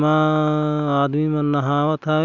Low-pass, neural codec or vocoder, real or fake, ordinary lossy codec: 7.2 kHz; none; real; none